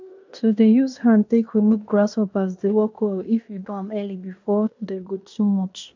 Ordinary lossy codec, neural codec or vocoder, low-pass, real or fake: MP3, 64 kbps; codec, 16 kHz in and 24 kHz out, 0.9 kbps, LongCat-Audio-Codec, fine tuned four codebook decoder; 7.2 kHz; fake